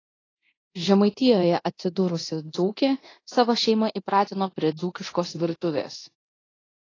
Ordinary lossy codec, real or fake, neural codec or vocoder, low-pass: AAC, 32 kbps; fake; codec, 24 kHz, 0.9 kbps, DualCodec; 7.2 kHz